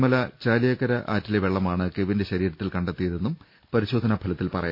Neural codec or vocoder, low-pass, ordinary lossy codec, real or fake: none; 5.4 kHz; MP3, 32 kbps; real